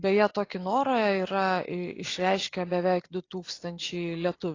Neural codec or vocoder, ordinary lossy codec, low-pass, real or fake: none; AAC, 32 kbps; 7.2 kHz; real